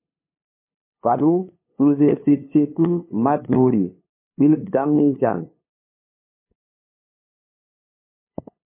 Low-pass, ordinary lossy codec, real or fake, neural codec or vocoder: 3.6 kHz; MP3, 24 kbps; fake; codec, 16 kHz, 2 kbps, FunCodec, trained on LibriTTS, 25 frames a second